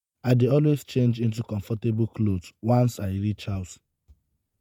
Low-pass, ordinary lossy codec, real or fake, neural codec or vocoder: 19.8 kHz; MP3, 96 kbps; fake; vocoder, 48 kHz, 128 mel bands, Vocos